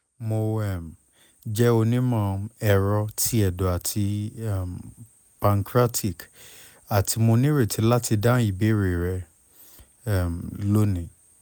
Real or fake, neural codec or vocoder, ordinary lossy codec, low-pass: real; none; none; none